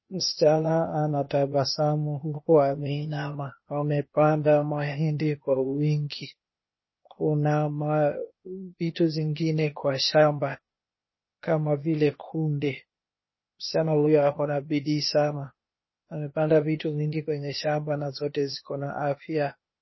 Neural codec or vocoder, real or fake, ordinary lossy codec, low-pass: codec, 16 kHz, 0.8 kbps, ZipCodec; fake; MP3, 24 kbps; 7.2 kHz